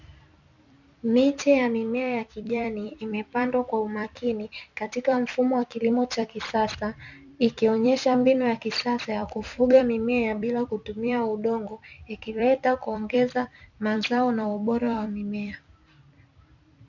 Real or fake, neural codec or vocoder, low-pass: fake; vocoder, 44.1 kHz, 128 mel bands every 256 samples, BigVGAN v2; 7.2 kHz